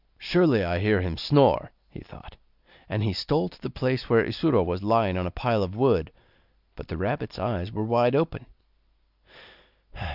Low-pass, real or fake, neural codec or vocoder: 5.4 kHz; real; none